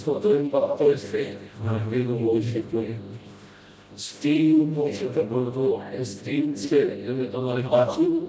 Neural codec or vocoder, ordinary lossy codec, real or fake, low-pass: codec, 16 kHz, 0.5 kbps, FreqCodec, smaller model; none; fake; none